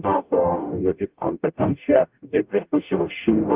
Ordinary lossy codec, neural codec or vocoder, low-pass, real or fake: Opus, 32 kbps; codec, 44.1 kHz, 0.9 kbps, DAC; 3.6 kHz; fake